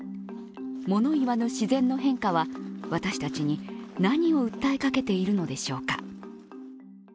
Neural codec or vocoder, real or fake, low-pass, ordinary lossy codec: none; real; none; none